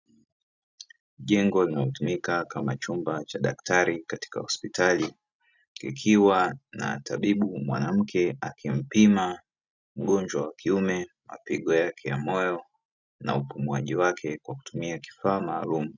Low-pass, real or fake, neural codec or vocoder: 7.2 kHz; real; none